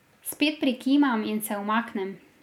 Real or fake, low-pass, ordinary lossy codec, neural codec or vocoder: real; 19.8 kHz; none; none